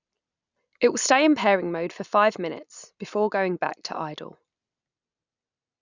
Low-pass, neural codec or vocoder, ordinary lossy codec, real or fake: 7.2 kHz; none; none; real